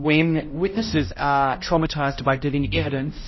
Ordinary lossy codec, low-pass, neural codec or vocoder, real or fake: MP3, 24 kbps; 7.2 kHz; codec, 16 kHz, 0.5 kbps, X-Codec, HuBERT features, trained on balanced general audio; fake